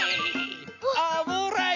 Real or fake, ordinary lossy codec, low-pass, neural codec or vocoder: real; none; 7.2 kHz; none